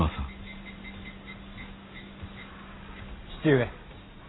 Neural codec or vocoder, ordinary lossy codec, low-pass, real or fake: none; AAC, 16 kbps; 7.2 kHz; real